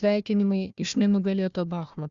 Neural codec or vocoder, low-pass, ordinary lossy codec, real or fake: codec, 16 kHz, 1 kbps, FunCodec, trained on Chinese and English, 50 frames a second; 7.2 kHz; Opus, 64 kbps; fake